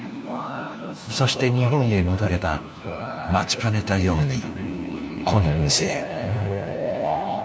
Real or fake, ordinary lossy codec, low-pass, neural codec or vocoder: fake; none; none; codec, 16 kHz, 1 kbps, FunCodec, trained on LibriTTS, 50 frames a second